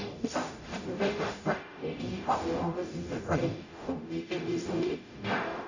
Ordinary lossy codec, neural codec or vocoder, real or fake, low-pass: none; codec, 44.1 kHz, 0.9 kbps, DAC; fake; 7.2 kHz